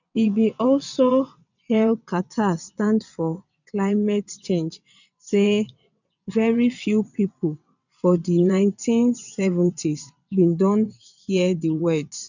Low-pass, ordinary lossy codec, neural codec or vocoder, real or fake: 7.2 kHz; none; vocoder, 22.05 kHz, 80 mel bands, WaveNeXt; fake